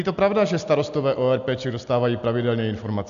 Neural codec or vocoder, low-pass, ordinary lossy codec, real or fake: none; 7.2 kHz; MP3, 64 kbps; real